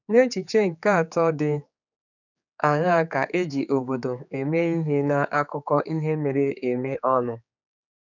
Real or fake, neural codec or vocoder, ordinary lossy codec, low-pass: fake; codec, 16 kHz, 4 kbps, X-Codec, HuBERT features, trained on general audio; none; 7.2 kHz